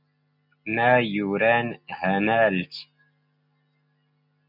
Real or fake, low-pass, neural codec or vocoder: real; 5.4 kHz; none